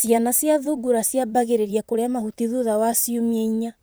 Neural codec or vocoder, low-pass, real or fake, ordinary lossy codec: vocoder, 44.1 kHz, 128 mel bands, Pupu-Vocoder; none; fake; none